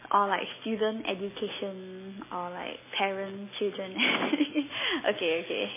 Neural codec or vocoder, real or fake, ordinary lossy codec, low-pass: none; real; MP3, 16 kbps; 3.6 kHz